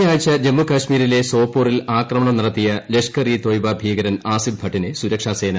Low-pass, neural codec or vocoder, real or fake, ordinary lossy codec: none; none; real; none